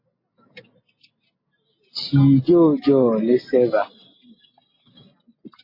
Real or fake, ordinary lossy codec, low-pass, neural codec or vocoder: real; MP3, 24 kbps; 5.4 kHz; none